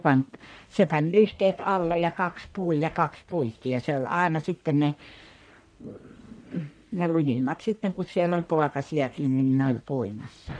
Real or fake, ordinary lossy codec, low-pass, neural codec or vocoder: fake; none; 9.9 kHz; codec, 44.1 kHz, 1.7 kbps, Pupu-Codec